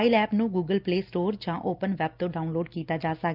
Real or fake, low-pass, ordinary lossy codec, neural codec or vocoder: real; 5.4 kHz; Opus, 24 kbps; none